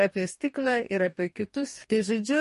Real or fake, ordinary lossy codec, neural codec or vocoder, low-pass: fake; MP3, 48 kbps; codec, 44.1 kHz, 2.6 kbps, DAC; 14.4 kHz